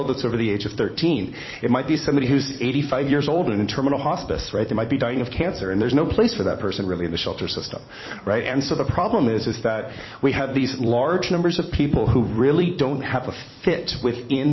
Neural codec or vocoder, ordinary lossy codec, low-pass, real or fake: vocoder, 44.1 kHz, 128 mel bands every 256 samples, BigVGAN v2; MP3, 24 kbps; 7.2 kHz; fake